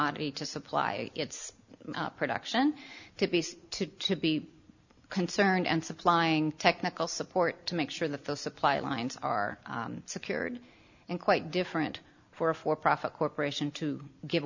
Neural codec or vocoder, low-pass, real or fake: none; 7.2 kHz; real